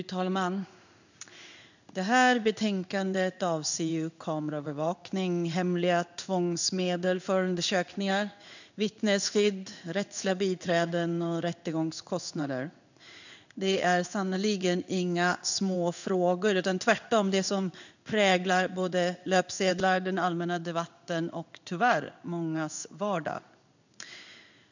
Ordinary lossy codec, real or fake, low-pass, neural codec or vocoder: none; fake; 7.2 kHz; codec, 16 kHz in and 24 kHz out, 1 kbps, XY-Tokenizer